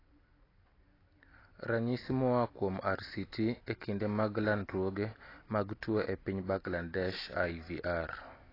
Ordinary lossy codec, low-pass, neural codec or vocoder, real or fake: AAC, 24 kbps; 5.4 kHz; none; real